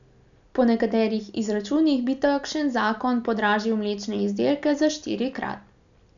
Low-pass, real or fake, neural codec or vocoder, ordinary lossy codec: 7.2 kHz; real; none; none